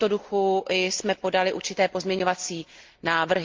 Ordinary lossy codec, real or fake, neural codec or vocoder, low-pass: Opus, 24 kbps; real; none; 7.2 kHz